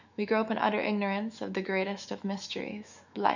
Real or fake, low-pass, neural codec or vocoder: fake; 7.2 kHz; autoencoder, 48 kHz, 128 numbers a frame, DAC-VAE, trained on Japanese speech